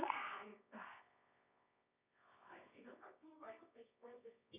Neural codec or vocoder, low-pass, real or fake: codec, 24 kHz, 0.9 kbps, WavTokenizer, medium music audio release; 3.6 kHz; fake